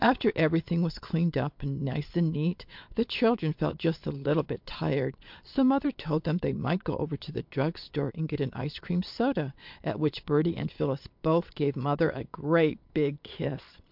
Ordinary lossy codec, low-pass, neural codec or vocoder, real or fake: MP3, 48 kbps; 5.4 kHz; vocoder, 22.05 kHz, 80 mel bands, Vocos; fake